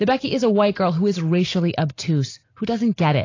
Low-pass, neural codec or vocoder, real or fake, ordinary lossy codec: 7.2 kHz; none; real; AAC, 32 kbps